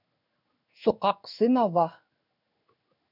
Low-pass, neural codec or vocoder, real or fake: 5.4 kHz; codec, 16 kHz in and 24 kHz out, 1 kbps, XY-Tokenizer; fake